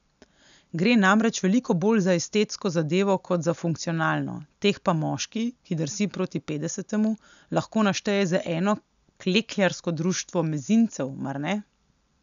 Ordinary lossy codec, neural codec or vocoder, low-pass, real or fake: none; none; 7.2 kHz; real